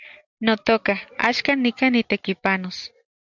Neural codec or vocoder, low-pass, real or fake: none; 7.2 kHz; real